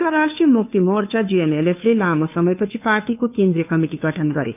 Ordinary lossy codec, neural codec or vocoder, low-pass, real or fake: none; codec, 16 kHz, 2 kbps, FunCodec, trained on Chinese and English, 25 frames a second; 3.6 kHz; fake